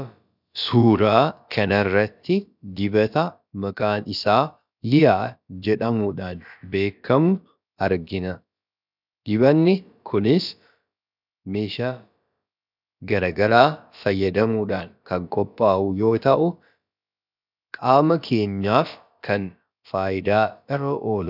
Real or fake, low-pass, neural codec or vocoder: fake; 5.4 kHz; codec, 16 kHz, about 1 kbps, DyCAST, with the encoder's durations